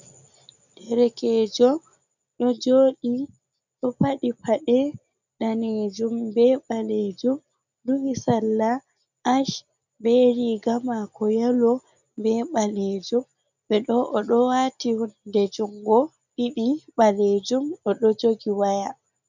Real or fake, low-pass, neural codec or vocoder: real; 7.2 kHz; none